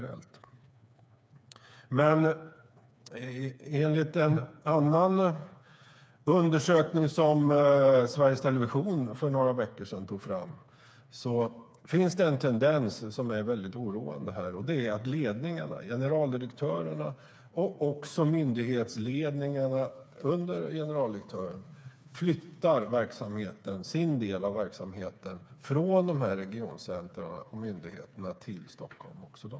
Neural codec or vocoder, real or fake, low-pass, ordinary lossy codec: codec, 16 kHz, 4 kbps, FreqCodec, smaller model; fake; none; none